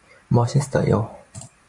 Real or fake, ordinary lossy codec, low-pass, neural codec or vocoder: fake; AAC, 64 kbps; 10.8 kHz; vocoder, 44.1 kHz, 128 mel bands every 512 samples, BigVGAN v2